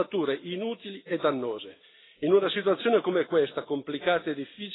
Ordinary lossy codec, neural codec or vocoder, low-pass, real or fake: AAC, 16 kbps; none; 7.2 kHz; real